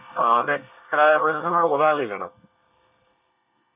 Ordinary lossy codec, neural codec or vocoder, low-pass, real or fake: none; codec, 24 kHz, 1 kbps, SNAC; 3.6 kHz; fake